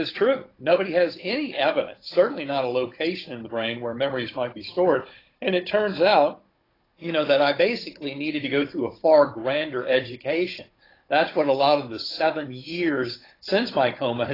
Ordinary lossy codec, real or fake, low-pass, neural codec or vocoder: AAC, 24 kbps; fake; 5.4 kHz; codec, 16 kHz, 16 kbps, FunCodec, trained on Chinese and English, 50 frames a second